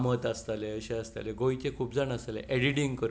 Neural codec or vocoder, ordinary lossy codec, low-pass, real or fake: none; none; none; real